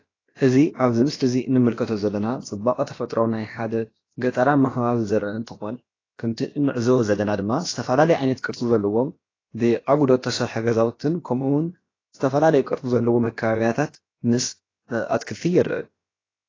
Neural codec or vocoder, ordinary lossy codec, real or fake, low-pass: codec, 16 kHz, about 1 kbps, DyCAST, with the encoder's durations; AAC, 32 kbps; fake; 7.2 kHz